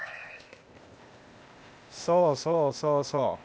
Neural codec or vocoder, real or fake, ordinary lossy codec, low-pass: codec, 16 kHz, 0.8 kbps, ZipCodec; fake; none; none